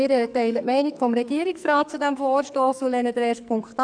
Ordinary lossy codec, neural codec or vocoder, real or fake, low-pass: none; codec, 44.1 kHz, 2.6 kbps, SNAC; fake; 9.9 kHz